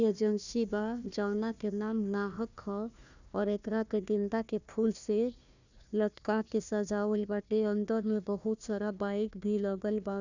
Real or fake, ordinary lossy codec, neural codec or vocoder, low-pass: fake; none; codec, 16 kHz, 1 kbps, FunCodec, trained on Chinese and English, 50 frames a second; 7.2 kHz